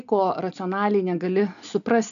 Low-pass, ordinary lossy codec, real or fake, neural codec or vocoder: 7.2 kHz; MP3, 96 kbps; real; none